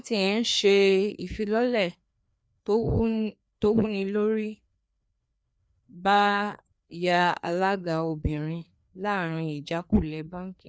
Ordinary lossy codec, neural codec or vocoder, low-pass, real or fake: none; codec, 16 kHz, 2 kbps, FreqCodec, larger model; none; fake